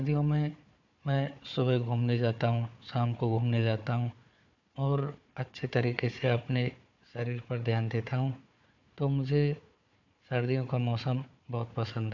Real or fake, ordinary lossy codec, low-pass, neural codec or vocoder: fake; MP3, 64 kbps; 7.2 kHz; codec, 16 kHz, 4 kbps, FunCodec, trained on Chinese and English, 50 frames a second